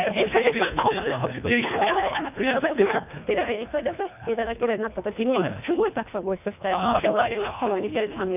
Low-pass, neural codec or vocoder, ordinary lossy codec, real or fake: 3.6 kHz; codec, 24 kHz, 1.5 kbps, HILCodec; none; fake